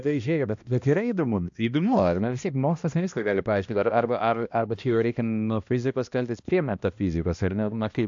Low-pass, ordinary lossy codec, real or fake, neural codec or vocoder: 7.2 kHz; AAC, 64 kbps; fake; codec, 16 kHz, 1 kbps, X-Codec, HuBERT features, trained on balanced general audio